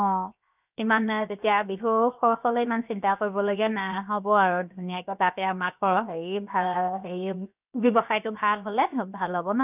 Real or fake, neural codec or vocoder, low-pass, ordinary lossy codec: fake; codec, 16 kHz, 0.7 kbps, FocalCodec; 3.6 kHz; none